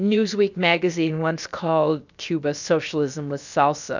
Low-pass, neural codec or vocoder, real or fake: 7.2 kHz; codec, 16 kHz, about 1 kbps, DyCAST, with the encoder's durations; fake